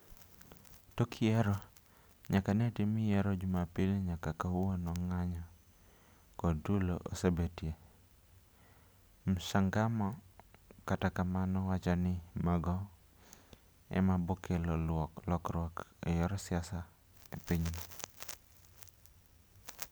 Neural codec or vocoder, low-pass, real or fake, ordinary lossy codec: none; none; real; none